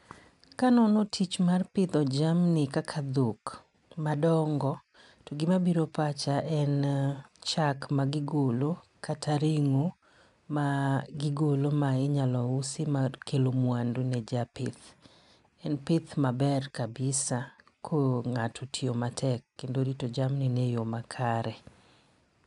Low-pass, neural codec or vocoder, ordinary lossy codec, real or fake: 10.8 kHz; none; none; real